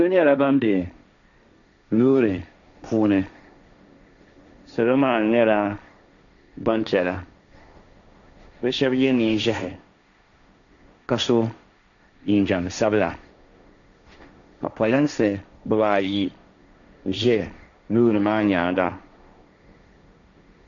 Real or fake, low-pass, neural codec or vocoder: fake; 7.2 kHz; codec, 16 kHz, 1.1 kbps, Voila-Tokenizer